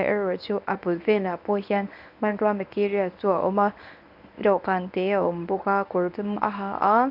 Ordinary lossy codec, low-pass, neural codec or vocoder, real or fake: none; 5.4 kHz; codec, 24 kHz, 0.9 kbps, WavTokenizer, medium speech release version 1; fake